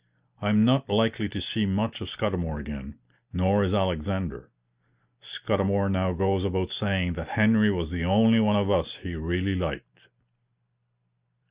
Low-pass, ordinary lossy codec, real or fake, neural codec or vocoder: 3.6 kHz; Opus, 64 kbps; real; none